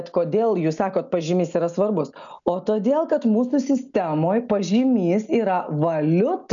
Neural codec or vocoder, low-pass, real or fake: none; 7.2 kHz; real